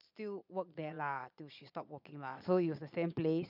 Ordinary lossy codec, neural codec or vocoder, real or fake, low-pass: none; vocoder, 22.05 kHz, 80 mel bands, Vocos; fake; 5.4 kHz